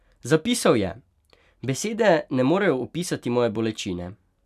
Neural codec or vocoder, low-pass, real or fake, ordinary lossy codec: none; 14.4 kHz; real; none